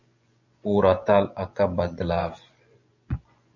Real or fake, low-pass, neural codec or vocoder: fake; 7.2 kHz; vocoder, 24 kHz, 100 mel bands, Vocos